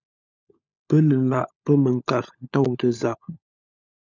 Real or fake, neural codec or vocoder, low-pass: fake; codec, 16 kHz, 16 kbps, FunCodec, trained on LibriTTS, 50 frames a second; 7.2 kHz